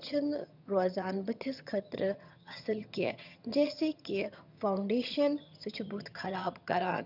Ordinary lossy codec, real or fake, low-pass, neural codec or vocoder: none; fake; 5.4 kHz; vocoder, 22.05 kHz, 80 mel bands, HiFi-GAN